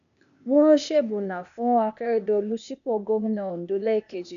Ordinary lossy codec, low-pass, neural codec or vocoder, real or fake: none; 7.2 kHz; codec, 16 kHz, 0.8 kbps, ZipCodec; fake